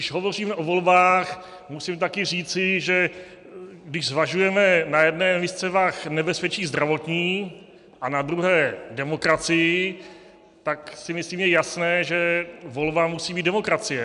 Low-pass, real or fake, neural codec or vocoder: 10.8 kHz; real; none